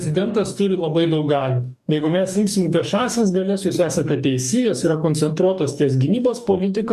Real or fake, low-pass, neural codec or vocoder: fake; 14.4 kHz; codec, 44.1 kHz, 2.6 kbps, DAC